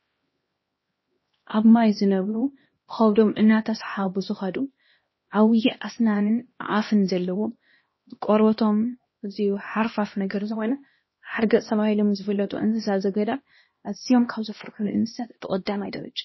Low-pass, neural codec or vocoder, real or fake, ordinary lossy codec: 7.2 kHz; codec, 16 kHz, 1 kbps, X-Codec, HuBERT features, trained on LibriSpeech; fake; MP3, 24 kbps